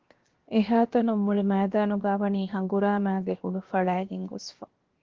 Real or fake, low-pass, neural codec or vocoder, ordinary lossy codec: fake; 7.2 kHz; codec, 16 kHz, 0.7 kbps, FocalCodec; Opus, 16 kbps